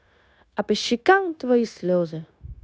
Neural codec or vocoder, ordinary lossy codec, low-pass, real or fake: codec, 16 kHz, 0.9 kbps, LongCat-Audio-Codec; none; none; fake